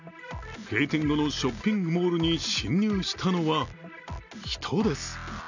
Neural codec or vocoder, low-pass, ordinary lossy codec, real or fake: none; 7.2 kHz; none; real